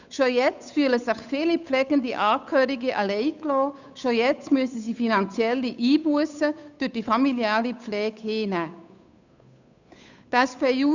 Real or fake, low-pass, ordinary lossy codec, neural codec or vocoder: fake; 7.2 kHz; none; codec, 16 kHz, 8 kbps, FunCodec, trained on Chinese and English, 25 frames a second